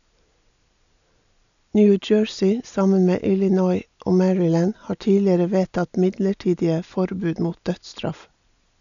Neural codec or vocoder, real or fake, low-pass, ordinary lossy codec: none; real; 7.2 kHz; none